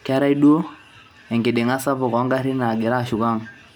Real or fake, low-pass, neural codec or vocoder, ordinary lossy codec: real; none; none; none